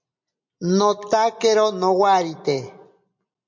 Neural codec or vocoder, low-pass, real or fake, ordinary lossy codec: none; 7.2 kHz; real; MP3, 48 kbps